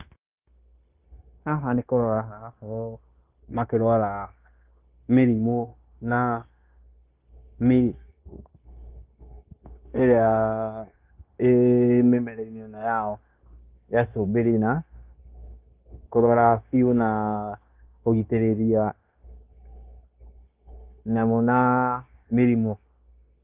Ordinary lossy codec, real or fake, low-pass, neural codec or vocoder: Opus, 32 kbps; fake; 3.6 kHz; codec, 16 kHz, 0.9 kbps, LongCat-Audio-Codec